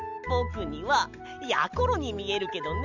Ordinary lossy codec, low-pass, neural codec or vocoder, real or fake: none; 7.2 kHz; none; real